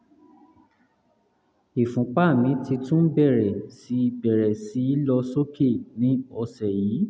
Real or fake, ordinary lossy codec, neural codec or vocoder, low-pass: real; none; none; none